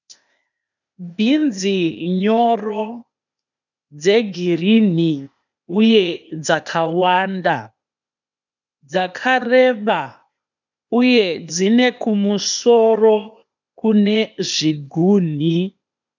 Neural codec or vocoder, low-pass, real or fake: codec, 16 kHz, 0.8 kbps, ZipCodec; 7.2 kHz; fake